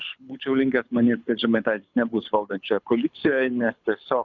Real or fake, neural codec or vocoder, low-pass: fake; codec, 24 kHz, 6 kbps, HILCodec; 7.2 kHz